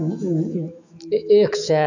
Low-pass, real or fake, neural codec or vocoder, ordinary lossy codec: 7.2 kHz; fake; autoencoder, 48 kHz, 128 numbers a frame, DAC-VAE, trained on Japanese speech; none